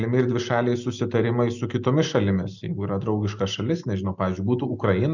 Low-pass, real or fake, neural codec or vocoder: 7.2 kHz; real; none